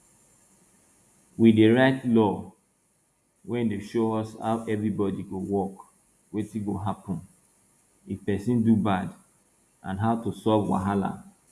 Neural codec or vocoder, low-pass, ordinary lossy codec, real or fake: none; 14.4 kHz; none; real